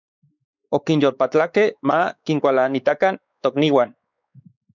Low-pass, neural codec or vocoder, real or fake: 7.2 kHz; codec, 16 kHz, 4 kbps, X-Codec, WavLM features, trained on Multilingual LibriSpeech; fake